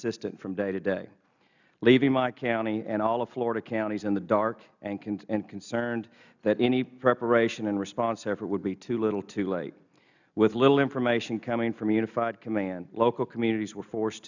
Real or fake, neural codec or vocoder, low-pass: real; none; 7.2 kHz